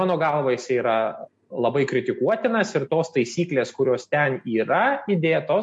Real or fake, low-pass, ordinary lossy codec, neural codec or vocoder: real; 10.8 kHz; MP3, 48 kbps; none